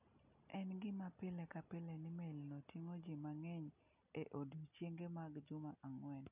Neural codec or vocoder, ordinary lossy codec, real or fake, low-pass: none; none; real; 3.6 kHz